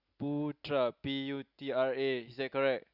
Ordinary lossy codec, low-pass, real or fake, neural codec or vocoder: none; 5.4 kHz; real; none